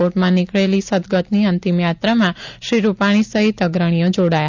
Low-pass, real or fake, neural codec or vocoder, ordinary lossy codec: 7.2 kHz; real; none; MP3, 64 kbps